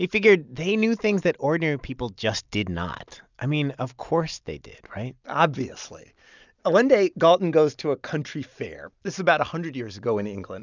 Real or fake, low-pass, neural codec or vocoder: real; 7.2 kHz; none